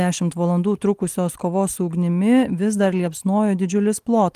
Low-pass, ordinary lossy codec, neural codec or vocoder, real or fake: 14.4 kHz; Opus, 24 kbps; none; real